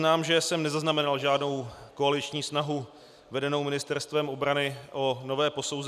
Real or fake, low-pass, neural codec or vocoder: real; 14.4 kHz; none